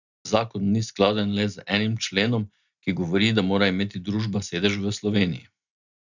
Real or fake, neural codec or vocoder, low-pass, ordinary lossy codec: real; none; 7.2 kHz; none